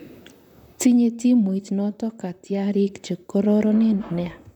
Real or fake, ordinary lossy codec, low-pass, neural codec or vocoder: fake; none; 19.8 kHz; vocoder, 44.1 kHz, 128 mel bands, Pupu-Vocoder